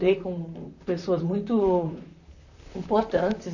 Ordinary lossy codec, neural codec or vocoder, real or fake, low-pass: none; vocoder, 44.1 kHz, 128 mel bands, Pupu-Vocoder; fake; 7.2 kHz